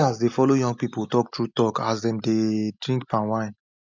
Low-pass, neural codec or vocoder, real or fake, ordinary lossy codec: 7.2 kHz; none; real; MP3, 64 kbps